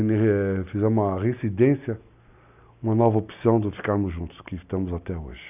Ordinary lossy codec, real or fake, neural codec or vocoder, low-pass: none; real; none; 3.6 kHz